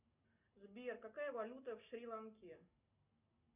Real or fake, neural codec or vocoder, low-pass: real; none; 3.6 kHz